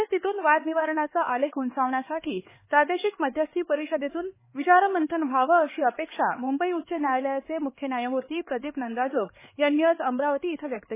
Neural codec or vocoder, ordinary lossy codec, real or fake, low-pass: codec, 16 kHz, 4 kbps, X-Codec, HuBERT features, trained on LibriSpeech; MP3, 16 kbps; fake; 3.6 kHz